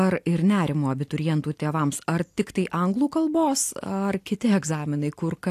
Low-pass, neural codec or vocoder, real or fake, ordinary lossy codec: 14.4 kHz; none; real; AAC, 64 kbps